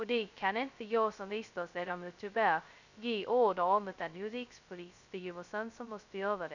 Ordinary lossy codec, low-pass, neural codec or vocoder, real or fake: none; 7.2 kHz; codec, 16 kHz, 0.2 kbps, FocalCodec; fake